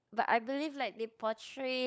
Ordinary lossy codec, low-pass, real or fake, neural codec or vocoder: none; none; fake; codec, 16 kHz, 16 kbps, FunCodec, trained on LibriTTS, 50 frames a second